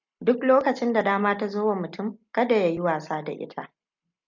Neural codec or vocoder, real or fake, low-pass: none; real; 7.2 kHz